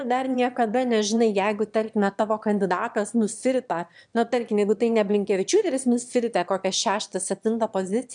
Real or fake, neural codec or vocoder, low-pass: fake; autoencoder, 22.05 kHz, a latent of 192 numbers a frame, VITS, trained on one speaker; 9.9 kHz